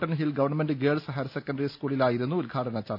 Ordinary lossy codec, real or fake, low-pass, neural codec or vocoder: none; real; 5.4 kHz; none